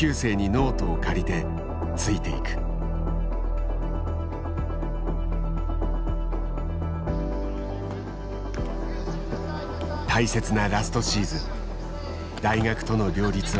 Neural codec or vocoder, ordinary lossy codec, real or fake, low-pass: none; none; real; none